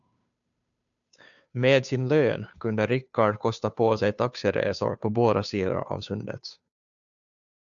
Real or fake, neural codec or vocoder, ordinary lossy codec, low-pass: fake; codec, 16 kHz, 2 kbps, FunCodec, trained on Chinese and English, 25 frames a second; MP3, 96 kbps; 7.2 kHz